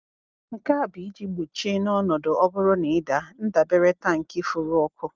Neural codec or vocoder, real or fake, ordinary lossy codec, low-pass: none; real; Opus, 32 kbps; 7.2 kHz